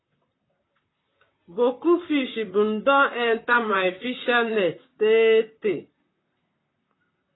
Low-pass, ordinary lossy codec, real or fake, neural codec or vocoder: 7.2 kHz; AAC, 16 kbps; fake; vocoder, 44.1 kHz, 128 mel bands, Pupu-Vocoder